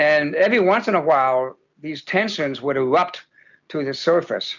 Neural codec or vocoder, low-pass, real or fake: none; 7.2 kHz; real